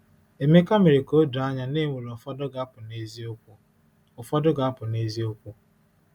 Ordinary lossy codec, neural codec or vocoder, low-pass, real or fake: none; none; 19.8 kHz; real